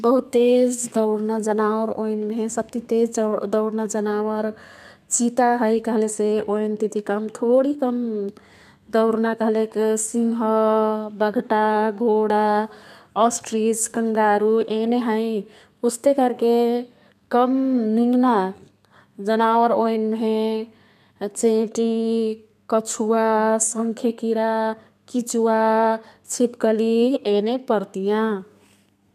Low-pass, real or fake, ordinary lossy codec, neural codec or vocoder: 14.4 kHz; fake; none; codec, 32 kHz, 1.9 kbps, SNAC